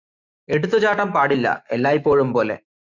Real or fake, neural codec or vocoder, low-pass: fake; autoencoder, 48 kHz, 128 numbers a frame, DAC-VAE, trained on Japanese speech; 7.2 kHz